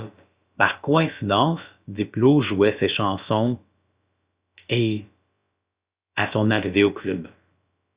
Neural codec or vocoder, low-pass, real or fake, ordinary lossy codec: codec, 16 kHz, about 1 kbps, DyCAST, with the encoder's durations; 3.6 kHz; fake; Opus, 64 kbps